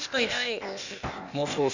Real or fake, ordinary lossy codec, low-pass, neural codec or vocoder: fake; none; 7.2 kHz; codec, 16 kHz, 0.8 kbps, ZipCodec